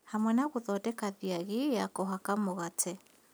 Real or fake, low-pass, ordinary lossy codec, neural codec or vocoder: real; none; none; none